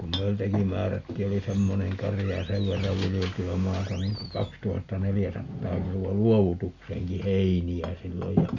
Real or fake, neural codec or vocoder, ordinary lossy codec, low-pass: real; none; none; 7.2 kHz